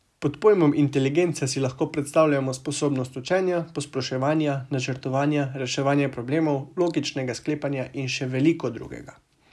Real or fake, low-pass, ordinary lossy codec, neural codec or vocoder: real; none; none; none